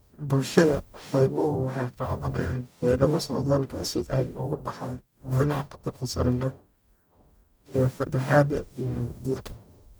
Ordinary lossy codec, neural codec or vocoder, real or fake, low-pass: none; codec, 44.1 kHz, 0.9 kbps, DAC; fake; none